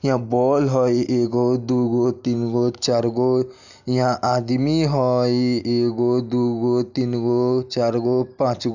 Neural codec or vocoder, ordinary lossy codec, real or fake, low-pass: none; none; real; 7.2 kHz